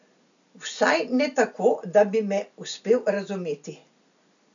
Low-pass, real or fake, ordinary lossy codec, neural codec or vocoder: 7.2 kHz; real; none; none